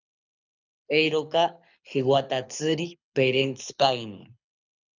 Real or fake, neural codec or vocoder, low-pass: fake; codec, 24 kHz, 6 kbps, HILCodec; 7.2 kHz